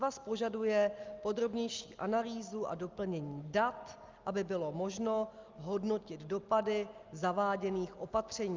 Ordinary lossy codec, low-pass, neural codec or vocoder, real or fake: Opus, 24 kbps; 7.2 kHz; none; real